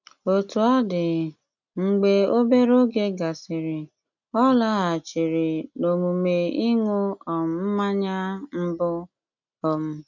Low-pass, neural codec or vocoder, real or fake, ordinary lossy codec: 7.2 kHz; none; real; none